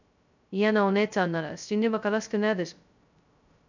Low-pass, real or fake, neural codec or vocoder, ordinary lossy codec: 7.2 kHz; fake; codec, 16 kHz, 0.2 kbps, FocalCodec; none